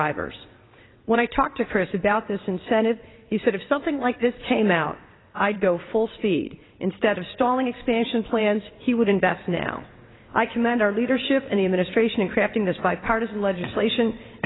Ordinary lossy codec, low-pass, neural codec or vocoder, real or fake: AAC, 16 kbps; 7.2 kHz; vocoder, 22.05 kHz, 80 mel bands, WaveNeXt; fake